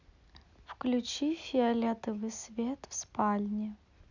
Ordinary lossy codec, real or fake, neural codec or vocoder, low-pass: none; real; none; 7.2 kHz